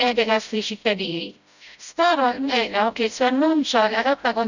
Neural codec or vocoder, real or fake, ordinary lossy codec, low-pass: codec, 16 kHz, 0.5 kbps, FreqCodec, smaller model; fake; none; 7.2 kHz